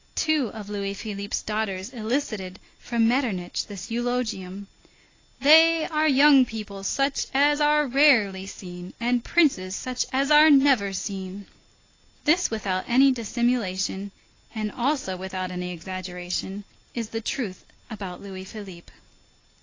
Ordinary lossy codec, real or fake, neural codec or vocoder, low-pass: AAC, 32 kbps; real; none; 7.2 kHz